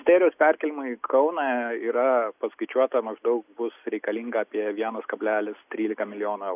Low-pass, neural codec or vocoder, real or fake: 3.6 kHz; none; real